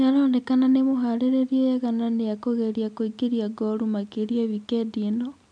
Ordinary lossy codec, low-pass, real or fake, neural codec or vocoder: MP3, 96 kbps; 9.9 kHz; real; none